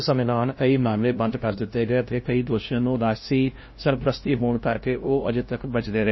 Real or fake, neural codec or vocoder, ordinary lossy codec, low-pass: fake; codec, 16 kHz, 0.5 kbps, FunCodec, trained on LibriTTS, 25 frames a second; MP3, 24 kbps; 7.2 kHz